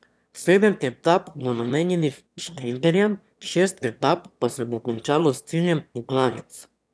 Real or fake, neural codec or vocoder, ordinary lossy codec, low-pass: fake; autoencoder, 22.05 kHz, a latent of 192 numbers a frame, VITS, trained on one speaker; none; none